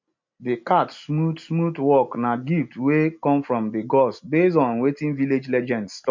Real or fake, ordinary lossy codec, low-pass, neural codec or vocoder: real; MP3, 48 kbps; 7.2 kHz; none